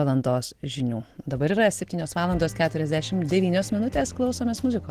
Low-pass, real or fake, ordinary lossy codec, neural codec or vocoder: 14.4 kHz; real; Opus, 16 kbps; none